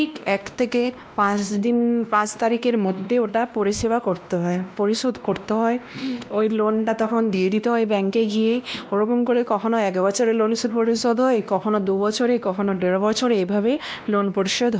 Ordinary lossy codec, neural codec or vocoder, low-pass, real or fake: none; codec, 16 kHz, 1 kbps, X-Codec, WavLM features, trained on Multilingual LibriSpeech; none; fake